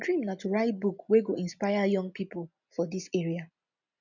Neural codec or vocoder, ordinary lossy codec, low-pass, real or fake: none; none; 7.2 kHz; real